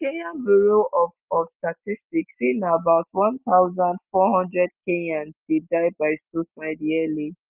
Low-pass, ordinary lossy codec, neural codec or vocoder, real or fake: 3.6 kHz; Opus, 24 kbps; none; real